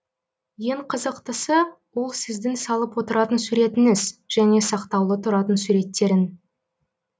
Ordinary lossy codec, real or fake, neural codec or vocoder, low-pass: none; real; none; none